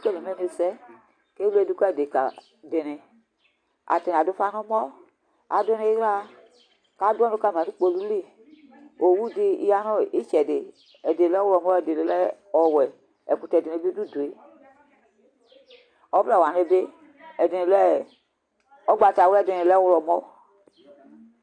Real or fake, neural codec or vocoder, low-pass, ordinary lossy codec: real; none; 9.9 kHz; AAC, 48 kbps